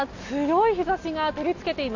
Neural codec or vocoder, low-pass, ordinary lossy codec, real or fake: none; 7.2 kHz; none; real